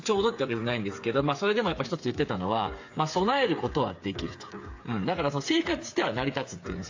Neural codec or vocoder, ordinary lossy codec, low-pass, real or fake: codec, 16 kHz, 8 kbps, FreqCodec, smaller model; AAC, 48 kbps; 7.2 kHz; fake